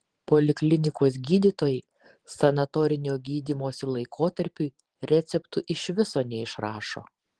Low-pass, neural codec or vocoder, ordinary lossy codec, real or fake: 10.8 kHz; vocoder, 44.1 kHz, 128 mel bands every 512 samples, BigVGAN v2; Opus, 16 kbps; fake